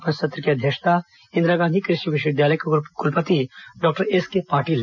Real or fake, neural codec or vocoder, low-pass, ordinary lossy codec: real; none; 7.2 kHz; none